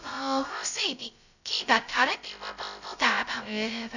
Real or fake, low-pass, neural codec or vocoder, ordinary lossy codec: fake; 7.2 kHz; codec, 16 kHz, 0.2 kbps, FocalCodec; none